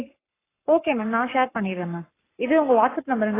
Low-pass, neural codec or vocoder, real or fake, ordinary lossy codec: 3.6 kHz; codec, 44.1 kHz, 7.8 kbps, Pupu-Codec; fake; AAC, 16 kbps